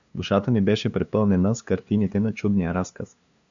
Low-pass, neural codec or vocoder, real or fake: 7.2 kHz; codec, 16 kHz, 2 kbps, FunCodec, trained on LibriTTS, 25 frames a second; fake